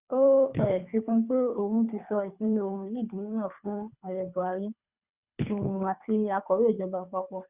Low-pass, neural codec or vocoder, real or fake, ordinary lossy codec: 3.6 kHz; codec, 24 kHz, 3 kbps, HILCodec; fake; Opus, 64 kbps